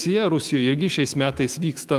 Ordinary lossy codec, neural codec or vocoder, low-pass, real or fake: Opus, 32 kbps; autoencoder, 48 kHz, 128 numbers a frame, DAC-VAE, trained on Japanese speech; 14.4 kHz; fake